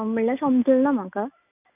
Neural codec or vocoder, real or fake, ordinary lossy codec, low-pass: none; real; none; 3.6 kHz